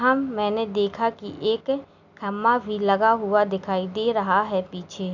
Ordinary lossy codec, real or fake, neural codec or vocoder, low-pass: none; real; none; 7.2 kHz